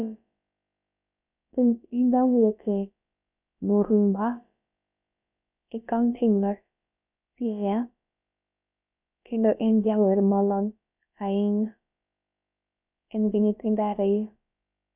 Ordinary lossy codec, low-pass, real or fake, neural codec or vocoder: none; 3.6 kHz; fake; codec, 16 kHz, about 1 kbps, DyCAST, with the encoder's durations